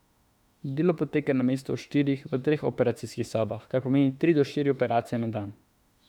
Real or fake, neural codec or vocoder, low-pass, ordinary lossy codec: fake; autoencoder, 48 kHz, 32 numbers a frame, DAC-VAE, trained on Japanese speech; 19.8 kHz; none